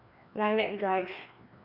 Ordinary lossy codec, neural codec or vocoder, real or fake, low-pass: none; codec, 16 kHz, 2 kbps, FreqCodec, larger model; fake; 5.4 kHz